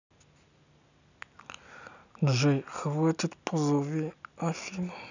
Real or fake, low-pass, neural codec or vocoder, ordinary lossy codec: real; 7.2 kHz; none; none